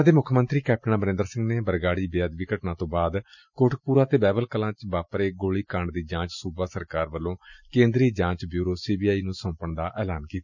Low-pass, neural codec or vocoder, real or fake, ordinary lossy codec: 7.2 kHz; none; real; none